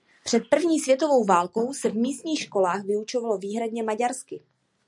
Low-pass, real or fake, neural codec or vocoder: 10.8 kHz; real; none